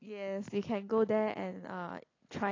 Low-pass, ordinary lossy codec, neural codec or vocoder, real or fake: 7.2 kHz; AAC, 32 kbps; none; real